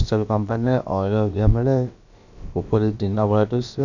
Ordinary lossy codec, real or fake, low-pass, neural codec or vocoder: none; fake; 7.2 kHz; codec, 16 kHz, about 1 kbps, DyCAST, with the encoder's durations